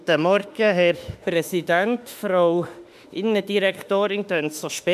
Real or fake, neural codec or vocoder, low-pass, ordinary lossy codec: fake; autoencoder, 48 kHz, 32 numbers a frame, DAC-VAE, trained on Japanese speech; 14.4 kHz; none